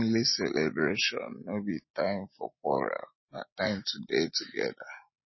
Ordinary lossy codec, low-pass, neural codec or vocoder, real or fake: MP3, 24 kbps; 7.2 kHz; none; real